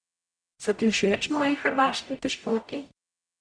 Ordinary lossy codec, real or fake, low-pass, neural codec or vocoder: none; fake; 9.9 kHz; codec, 44.1 kHz, 0.9 kbps, DAC